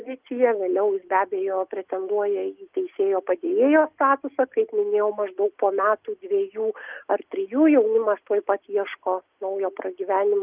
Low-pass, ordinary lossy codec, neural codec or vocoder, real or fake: 3.6 kHz; Opus, 24 kbps; none; real